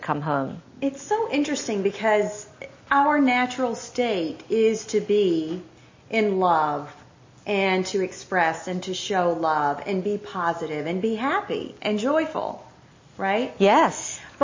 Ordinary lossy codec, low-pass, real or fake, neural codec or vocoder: MP3, 32 kbps; 7.2 kHz; real; none